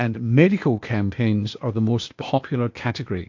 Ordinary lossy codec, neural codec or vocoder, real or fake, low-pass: MP3, 48 kbps; codec, 16 kHz, 0.8 kbps, ZipCodec; fake; 7.2 kHz